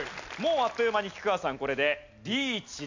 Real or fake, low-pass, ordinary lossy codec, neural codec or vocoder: real; 7.2 kHz; MP3, 64 kbps; none